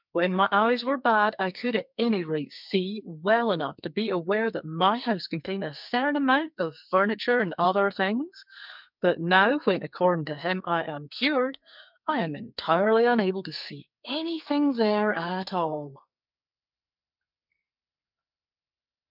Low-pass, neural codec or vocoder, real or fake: 5.4 kHz; codec, 44.1 kHz, 2.6 kbps, SNAC; fake